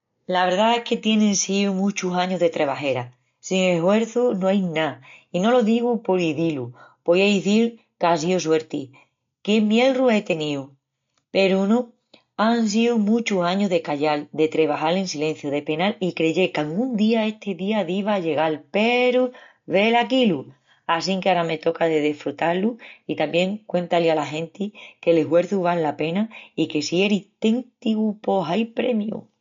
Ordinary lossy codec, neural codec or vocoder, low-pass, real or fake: MP3, 48 kbps; none; 7.2 kHz; real